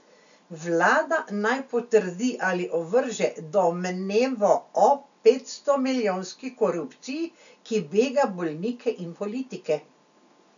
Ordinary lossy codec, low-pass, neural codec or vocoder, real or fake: none; 7.2 kHz; none; real